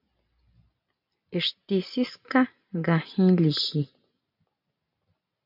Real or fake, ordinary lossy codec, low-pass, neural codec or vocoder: real; MP3, 48 kbps; 5.4 kHz; none